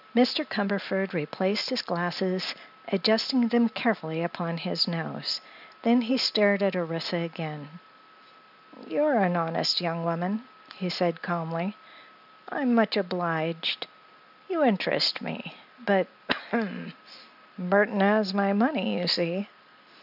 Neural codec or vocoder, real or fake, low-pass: none; real; 5.4 kHz